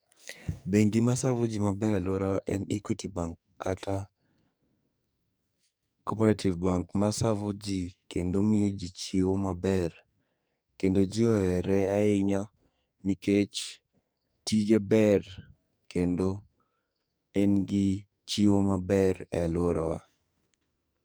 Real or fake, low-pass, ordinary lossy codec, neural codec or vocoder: fake; none; none; codec, 44.1 kHz, 2.6 kbps, SNAC